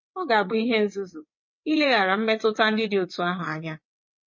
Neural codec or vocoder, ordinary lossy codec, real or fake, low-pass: vocoder, 22.05 kHz, 80 mel bands, WaveNeXt; MP3, 32 kbps; fake; 7.2 kHz